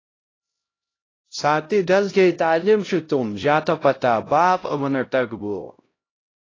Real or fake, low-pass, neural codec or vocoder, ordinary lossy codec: fake; 7.2 kHz; codec, 16 kHz, 0.5 kbps, X-Codec, HuBERT features, trained on LibriSpeech; AAC, 32 kbps